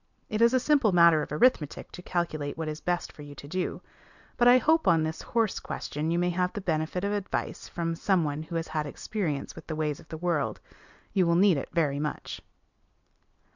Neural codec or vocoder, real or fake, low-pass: none; real; 7.2 kHz